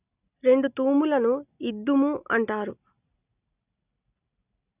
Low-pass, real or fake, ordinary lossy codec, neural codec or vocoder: 3.6 kHz; real; none; none